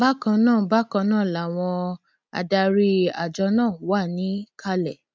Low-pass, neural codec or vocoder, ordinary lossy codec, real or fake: none; none; none; real